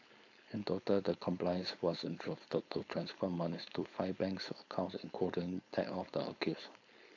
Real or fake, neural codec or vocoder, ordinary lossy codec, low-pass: fake; codec, 16 kHz, 4.8 kbps, FACodec; AAC, 48 kbps; 7.2 kHz